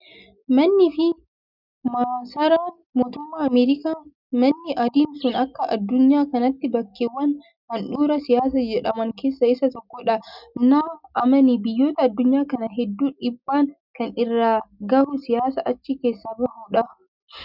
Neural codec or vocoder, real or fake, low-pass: none; real; 5.4 kHz